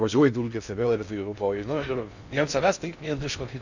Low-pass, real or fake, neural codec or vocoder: 7.2 kHz; fake; codec, 16 kHz in and 24 kHz out, 0.6 kbps, FocalCodec, streaming, 2048 codes